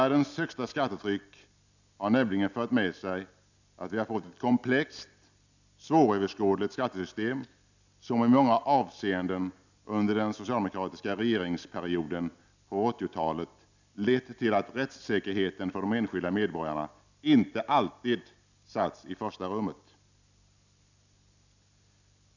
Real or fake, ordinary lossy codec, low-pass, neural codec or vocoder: real; none; 7.2 kHz; none